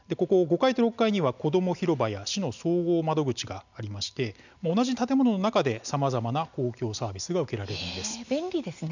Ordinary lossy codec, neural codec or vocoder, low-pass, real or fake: none; none; 7.2 kHz; real